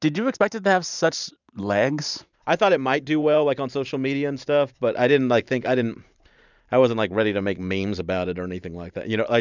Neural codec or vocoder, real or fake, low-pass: vocoder, 44.1 kHz, 128 mel bands every 512 samples, BigVGAN v2; fake; 7.2 kHz